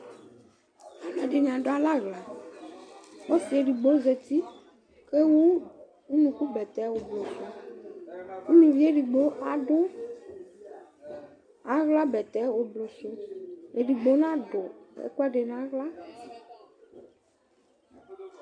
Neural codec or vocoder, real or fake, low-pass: none; real; 9.9 kHz